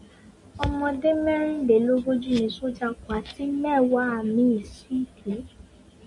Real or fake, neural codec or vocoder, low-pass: real; none; 10.8 kHz